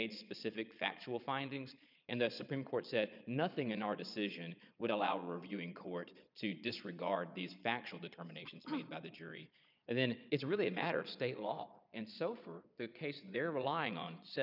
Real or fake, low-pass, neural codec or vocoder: fake; 5.4 kHz; vocoder, 22.05 kHz, 80 mel bands, Vocos